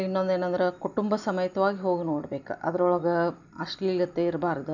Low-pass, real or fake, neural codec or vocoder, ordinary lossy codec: 7.2 kHz; real; none; none